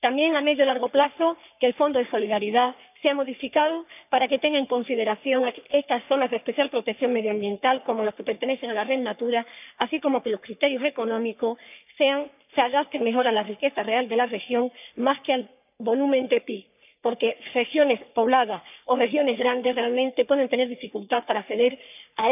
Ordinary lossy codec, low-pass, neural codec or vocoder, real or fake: none; 3.6 kHz; codec, 44.1 kHz, 3.4 kbps, Pupu-Codec; fake